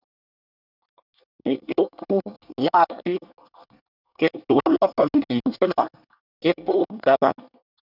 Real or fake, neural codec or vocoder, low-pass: fake; codec, 24 kHz, 1 kbps, SNAC; 5.4 kHz